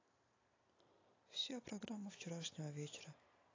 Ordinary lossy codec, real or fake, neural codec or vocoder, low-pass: AAC, 32 kbps; real; none; 7.2 kHz